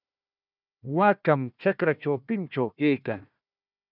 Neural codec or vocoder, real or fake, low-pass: codec, 16 kHz, 1 kbps, FunCodec, trained on Chinese and English, 50 frames a second; fake; 5.4 kHz